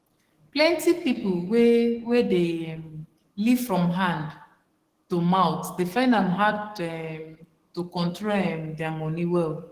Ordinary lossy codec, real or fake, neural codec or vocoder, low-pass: Opus, 16 kbps; fake; codec, 44.1 kHz, 7.8 kbps, DAC; 14.4 kHz